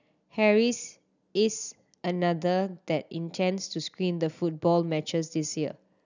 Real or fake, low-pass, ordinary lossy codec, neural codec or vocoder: real; 7.2 kHz; none; none